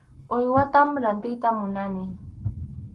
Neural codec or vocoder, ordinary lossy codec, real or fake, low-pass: codec, 44.1 kHz, 7.8 kbps, Pupu-Codec; Opus, 24 kbps; fake; 10.8 kHz